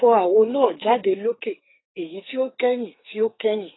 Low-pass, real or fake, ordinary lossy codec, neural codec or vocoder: 7.2 kHz; fake; AAC, 16 kbps; codec, 44.1 kHz, 2.6 kbps, SNAC